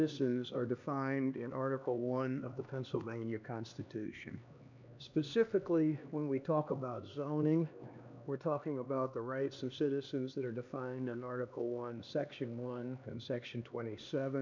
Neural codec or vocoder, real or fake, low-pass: codec, 16 kHz, 2 kbps, X-Codec, HuBERT features, trained on LibriSpeech; fake; 7.2 kHz